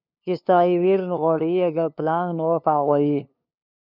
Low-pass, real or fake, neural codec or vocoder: 5.4 kHz; fake; codec, 16 kHz, 2 kbps, FunCodec, trained on LibriTTS, 25 frames a second